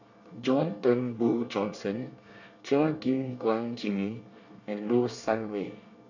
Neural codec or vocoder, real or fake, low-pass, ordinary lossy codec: codec, 24 kHz, 1 kbps, SNAC; fake; 7.2 kHz; none